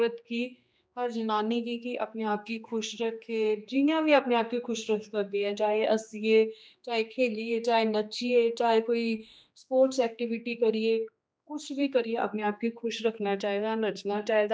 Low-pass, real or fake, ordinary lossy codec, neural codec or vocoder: none; fake; none; codec, 16 kHz, 2 kbps, X-Codec, HuBERT features, trained on general audio